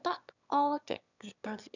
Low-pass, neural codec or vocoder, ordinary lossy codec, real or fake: 7.2 kHz; autoencoder, 22.05 kHz, a latent of 192 numbers a frame, VITS, trained on one speaker; none; fake